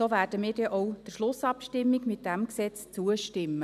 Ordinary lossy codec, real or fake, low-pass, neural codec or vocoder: none; real; 14.4 kHz; none